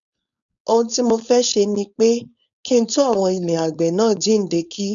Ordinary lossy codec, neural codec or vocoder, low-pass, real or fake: none; codec, 16 kHz, 4.8 kbps, FACodec; 7.2 kHz; fake